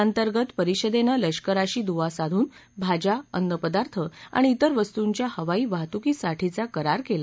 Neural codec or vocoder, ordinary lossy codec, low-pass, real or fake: none; none; none; real